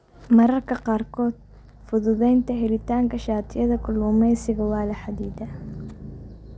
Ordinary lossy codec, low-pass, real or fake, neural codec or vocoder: none; none; real; none